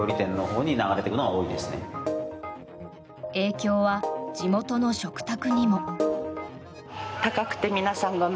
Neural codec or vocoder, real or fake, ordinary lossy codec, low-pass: none; real; none; none